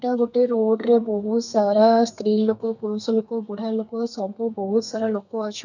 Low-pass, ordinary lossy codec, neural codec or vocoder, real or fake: 7.2 kHz; none; codec, 32 kHz, 1.9 kbps, SNAC; fake